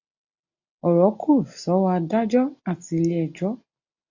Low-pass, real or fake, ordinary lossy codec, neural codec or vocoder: 7.2 kHz; real; AAC, 48 kbps; none